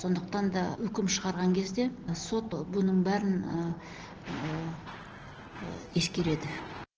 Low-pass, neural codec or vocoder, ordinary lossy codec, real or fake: 7.2 kHz; none; Opus, 16 kbps; real